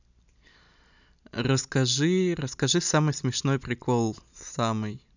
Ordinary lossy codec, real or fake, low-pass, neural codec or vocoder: none; real; 7.2 kHz; none